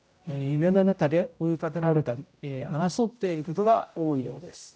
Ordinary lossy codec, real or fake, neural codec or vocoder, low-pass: none; fake; codec, 16 kHz, 0.5 kbps, X-Codec, HuBERT features, trained on general audio; none